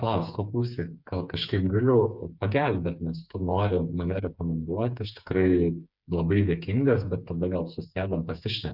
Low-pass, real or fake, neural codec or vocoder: 5.4 kHz; fake; codec, 16 kHz, 4 kbps, FreqCodec, smaller model